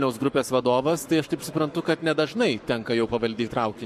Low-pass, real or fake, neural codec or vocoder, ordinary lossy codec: 14.4 kHz; fake; codec, 44.1 kHz, 7.8 kbps, Pupu-Codec; MP3, 64 kbps